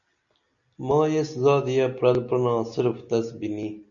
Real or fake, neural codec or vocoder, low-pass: real; none; 7.2 kHz